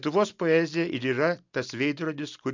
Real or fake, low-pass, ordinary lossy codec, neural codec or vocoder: real; 7.2 kHz; MP3, 64 kbps; none